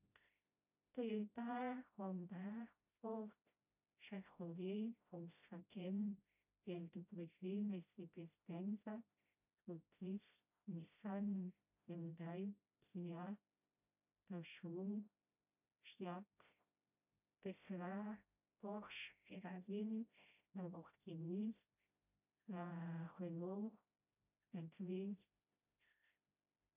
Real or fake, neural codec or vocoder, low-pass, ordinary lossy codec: fake; codec, 16 kHz, 0.5 kbps, FreqCodec, smaller model; 3.6 kHz; none